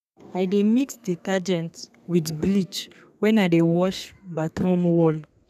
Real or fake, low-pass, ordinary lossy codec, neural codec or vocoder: fake; 14.4 kHz; none; codec, 32 kHz, 1.9 kbps, SNAC